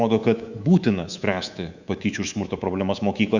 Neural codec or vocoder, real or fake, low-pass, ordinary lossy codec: codec, 24 kHz, 3.1 kbps, DualCodec; fake; 7.2 kHz; Opus, 64 kbps